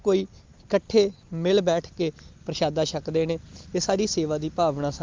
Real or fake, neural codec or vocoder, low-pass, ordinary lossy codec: real; none; 7.2 kHz; Opus, 32 kbps